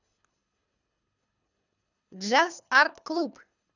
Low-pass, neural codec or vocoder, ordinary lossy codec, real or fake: 7.2 kHz; codec, 24 kHz, 3 kbps, HILCodec; none; fake